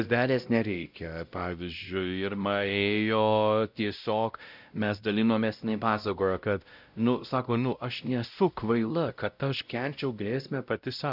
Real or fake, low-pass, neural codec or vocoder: fake; 5.4 kHz; codec, 16 kHz, 0.5 kbps, X-Codec, WavLM features, trained on Multilingual LibriSpeech